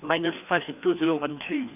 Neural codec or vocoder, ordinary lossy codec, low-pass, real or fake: codec, 16 kHz, 2 kbps, FreqCodec, larger model; none; 3.6 kHz; fake